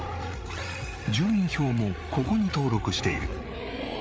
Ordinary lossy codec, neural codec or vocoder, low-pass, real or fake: none; codec, 16 kHz, 8 kbps, FreqCodec, larger model; none; fake